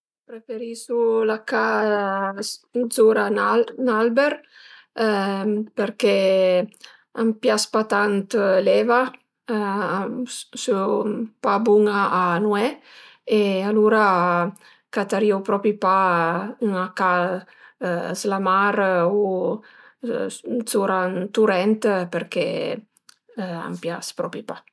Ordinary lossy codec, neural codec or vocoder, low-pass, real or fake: none; none; none; real